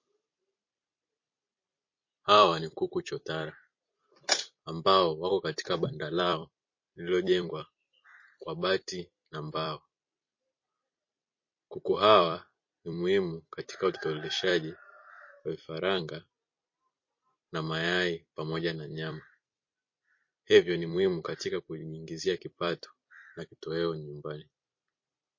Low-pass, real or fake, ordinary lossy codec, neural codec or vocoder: 7.2 kHz; fake; MP3, 32 kbps; vocoder, 44.1 kHz, 128 mel bands every 256 samples, BigVGAN v2